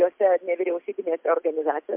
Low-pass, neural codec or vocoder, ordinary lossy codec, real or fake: 3.6 kHz; none; MP3, 32 kbps; real